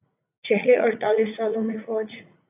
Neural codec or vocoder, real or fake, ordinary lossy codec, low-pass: vocoder, 44.1 kHz, 80 mel bands, Vocos; fake; AAC, 24 kbps; 3.6 kHz